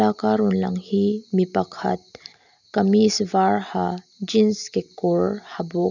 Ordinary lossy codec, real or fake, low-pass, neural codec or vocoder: none; real; 7.2 kHz; none